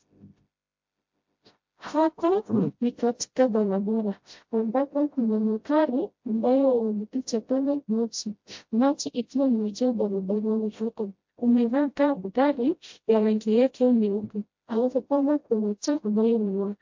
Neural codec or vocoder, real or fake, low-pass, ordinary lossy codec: codec, 16 kHz, 0.5 kbps, FreqCodec, smaller model; fake; 7.2 kHz; MP3, 48 kbps